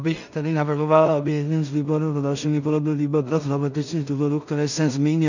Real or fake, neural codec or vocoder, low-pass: fake; codec, 16 kHz in and 24 kHz out, 0.4 kbps, LongCat-Audio-Codec, two codebook decoder; 7.2 kHz